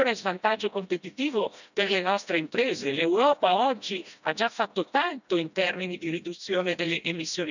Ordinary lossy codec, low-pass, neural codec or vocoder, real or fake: none; 7.2 kHz; codec, 16 kHz, 1 kbps, FreqCodec, smaller model; fake